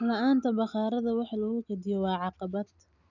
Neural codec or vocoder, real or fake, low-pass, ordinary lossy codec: none; real; 7.2 kHz; none